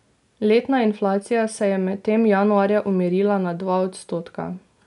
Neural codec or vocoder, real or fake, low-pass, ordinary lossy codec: none; real; 10.8 kHz; none